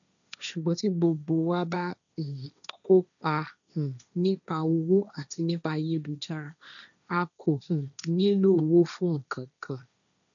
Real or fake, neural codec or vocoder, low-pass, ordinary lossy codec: fake; codec, 16 kHz, 1.1 kbps, Voila-Tokenizer; 7.2 kHz; none